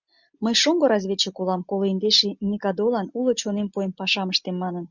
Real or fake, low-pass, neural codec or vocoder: real; 7.2 kHz; none